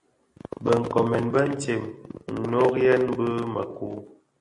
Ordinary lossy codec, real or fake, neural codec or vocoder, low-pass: MP3, 48 kbps; real; none; 10.8 kHz